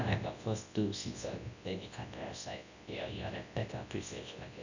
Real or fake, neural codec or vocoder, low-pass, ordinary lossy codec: fake; codec, 24 kHz, 0.9 kbps, WavTokenizer, large speech release; 7.2 kHz; none